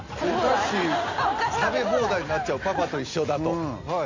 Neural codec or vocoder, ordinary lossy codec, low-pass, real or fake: none; MP3, 48 kbps; 7.2 kHz; real